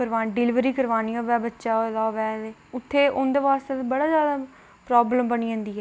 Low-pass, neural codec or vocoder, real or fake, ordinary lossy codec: none; none; real; none